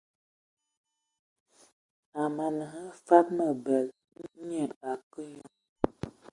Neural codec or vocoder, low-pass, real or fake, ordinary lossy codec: none; 10.8 kHz; real; Opus, 64 kbps